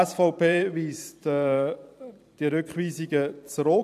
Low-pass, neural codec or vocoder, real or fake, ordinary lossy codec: 14.4 kHz; vocoder, 44.1 kHz, 128 mel bands every 512 samples, BigVGAN v2; fake; none